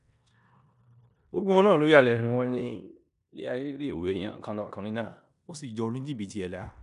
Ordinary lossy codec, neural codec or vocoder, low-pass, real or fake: none; codec, 16 kHz in and 24 kHz out, 0.9 kbps, LongCat-Audio-Codec, four codebook decoder; 10.8 kHz; fake